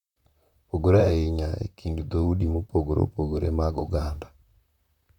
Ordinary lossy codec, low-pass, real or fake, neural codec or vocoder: none; 19.8 kHz; fake; vocoder, 44.1 kHz, 128 mel bands, Pupu-Vocoder